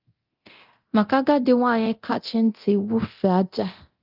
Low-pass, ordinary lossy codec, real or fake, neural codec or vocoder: 5.4 kHz; Opus, 32 kbps; fake; codec, 24 kHz, 0.9 kbps, DualCodec